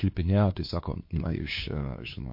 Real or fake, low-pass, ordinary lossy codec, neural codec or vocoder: fake; 5.4 kHz; MP3, 32 kbps; codec, 16 kHz, 2 kbps, FunCodec, trained on LibriTTS, 25 frames a second